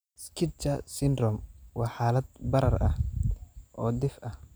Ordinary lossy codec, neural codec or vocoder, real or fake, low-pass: none; none; real; none